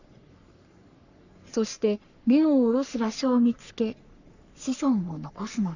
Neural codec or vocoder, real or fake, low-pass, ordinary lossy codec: codec, 44.1 kHz, 3.4 kbps, Pupu-Codec; fake; 7.2 kHz; none